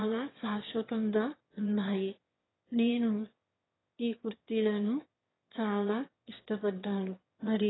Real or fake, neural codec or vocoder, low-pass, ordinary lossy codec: fake; autoencoder, 22.05 kHz, a latent of 192 numbers a frame, VITS, trained on one speaker; 7.2 kHz; AAC, 16 kbps